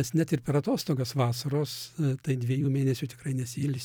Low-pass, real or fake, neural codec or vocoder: 19.8 kHz; fake; vocoder, 44.1 kHz, 128 mel bands every 256 samples, BigVGAN v2